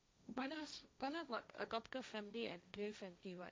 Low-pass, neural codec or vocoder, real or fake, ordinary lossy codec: none; codec, 16 kHz, 1.1 kbps, Voila-Tokenizer; fake; none